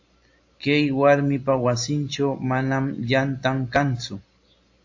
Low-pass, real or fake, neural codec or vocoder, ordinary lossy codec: 7.2 kHz; real; none; AAC, 48 kbps